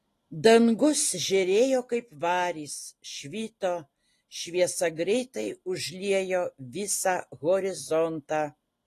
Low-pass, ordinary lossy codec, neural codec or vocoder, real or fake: 14.4 kHz; AAC, 48 kbps; none; real